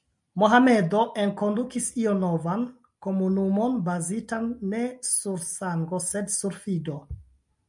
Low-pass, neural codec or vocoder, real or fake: 10.8 kHz; none; real